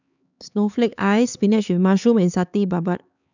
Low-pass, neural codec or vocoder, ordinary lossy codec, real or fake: 7.2 kHz; codec, 16 kHz, 4 kbps, X-Codec, HuBERT features, trained on LibriSpeech; none; fake